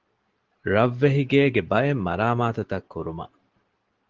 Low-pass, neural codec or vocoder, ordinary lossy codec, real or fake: 7.2 kHz; none; Opus, 16 kbps; real